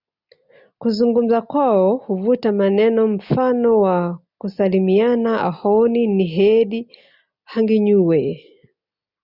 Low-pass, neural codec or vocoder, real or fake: 5.4 kHz; none; real